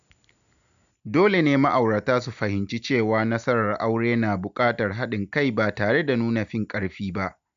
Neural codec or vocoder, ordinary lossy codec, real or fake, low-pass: none; none; real; 7.2 kHz